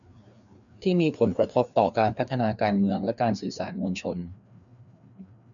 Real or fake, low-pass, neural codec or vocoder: fake; 7.2 kHz; codec, 16 kHz, 2 kbps, FreqCodec, larger model